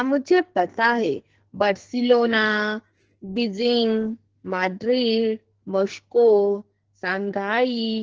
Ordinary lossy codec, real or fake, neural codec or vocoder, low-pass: Opus, 16 kbps; fake; codec, 44.1 kHz, 2.6 kbps, SNAC; 7.2 kHz